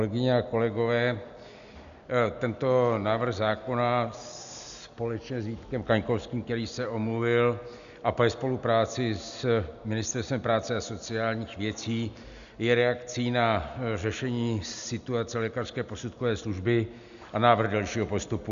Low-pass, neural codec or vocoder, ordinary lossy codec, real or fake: 7.2 kHz; none; MP3, 96 kbps; real